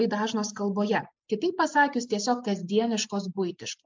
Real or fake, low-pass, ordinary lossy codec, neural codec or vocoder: real; 7.2 kHz; MP3, 64 kbps; none